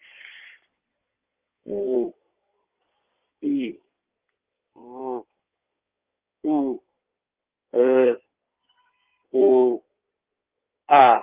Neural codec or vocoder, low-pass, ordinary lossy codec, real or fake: codec, 16 kHz in and 24 kHz out, 2.2 kbps, FireRedTTS-2 codec; 3.6 kHz; Opus, 32 kbps; fake